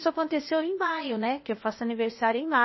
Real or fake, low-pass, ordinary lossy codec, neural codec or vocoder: fake; 7.2 kHz; MP3, 24 kbps; codec, 16 kHz, 0.8 kbps, ZipCodec